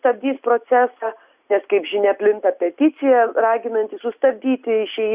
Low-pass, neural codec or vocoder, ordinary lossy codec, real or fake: 3.6 kHz; none; Opus, 64 kbps; real